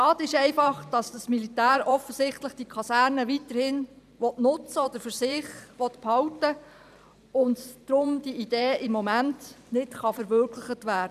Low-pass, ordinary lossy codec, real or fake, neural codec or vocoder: 14.4 kHz; none; fake; vocoder, 44.1 kHz, 128 mel bands every 512 samples, BigVGAN v2